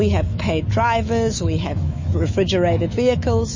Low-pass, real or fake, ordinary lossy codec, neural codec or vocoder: 7.2 kHz; fake; MP3, 32 kbps; autoencoder, 48 kHz, 128 numbers a frame, DAC-VAE, trained on Japanese speech